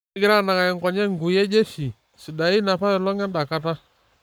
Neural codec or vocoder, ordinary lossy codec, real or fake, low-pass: codec, 44.1 kHz, 7.8 kbps, Pupu-Codec; none; fake; none